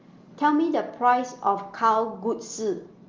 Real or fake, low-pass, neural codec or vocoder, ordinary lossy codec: real; 7.2 kHz; none; Opus, 32 kbps